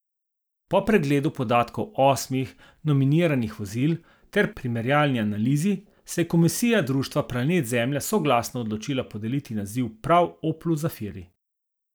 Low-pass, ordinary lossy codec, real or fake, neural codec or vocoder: none; none; real; none